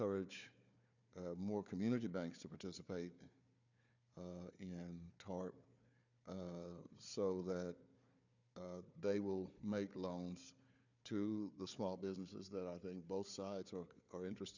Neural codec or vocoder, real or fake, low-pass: codec, 16 kHz, 4 kbps, FunCodec, trained on Chinese and English, 50 frames a second; fake; 7.2 kHz